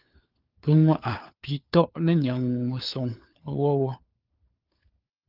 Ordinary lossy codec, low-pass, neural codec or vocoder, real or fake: Opus, 24 kbps; 5.4 kHz; codec, 16 kHz, 4 kbps, FunCodec, trained on LibriTTS, 50 frames a second; fake